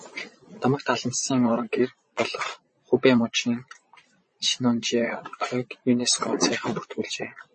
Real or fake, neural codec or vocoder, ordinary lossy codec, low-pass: fake; vocoder, 22.05 kHz, 80 mel bands, Vocos; MP3, 32 kbps; 9.9 kHz